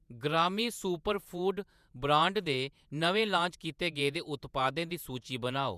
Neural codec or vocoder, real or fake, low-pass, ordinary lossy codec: vocoder, 48 kHz, 128 mel bands, Vocos; fake; 14.4 kHz; none